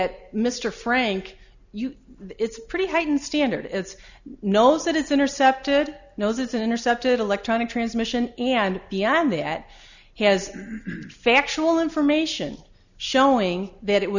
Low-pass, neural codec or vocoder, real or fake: 7.2 kHz; none; real